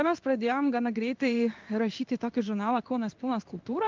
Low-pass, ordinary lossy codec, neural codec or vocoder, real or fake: 7.2 kHz; Opus, 24 kbps; codec, 16 kHz in and 24 kHz out, 1 kbps, XY-Tokenizer; fake